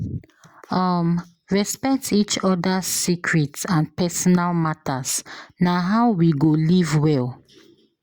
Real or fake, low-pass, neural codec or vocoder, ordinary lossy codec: real; 19.8 kHz; none; Opus, 64 kbps